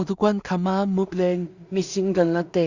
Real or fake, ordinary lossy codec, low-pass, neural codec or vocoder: fake; Opus, 64 kbps; 7.2 kHz; codec, 16 kHz in and 24 kHz out, 0.4 kbps, LongCat-Audio-Codec, two codebook decoder